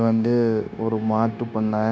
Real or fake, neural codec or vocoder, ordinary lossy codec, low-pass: fake; codec, 16 kHz, 0.9 kbps, LongCat-Audio-Codec; none; none